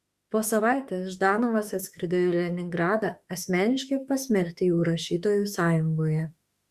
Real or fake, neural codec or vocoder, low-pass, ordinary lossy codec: fake; autoencoder, 48 kHz, 32 numbers a frame, DAC-VAE, trained on Japanese speech; 14.4 kHz; Opus, 64 kbps